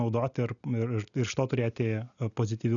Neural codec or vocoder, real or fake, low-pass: none; real; 7.2 kHz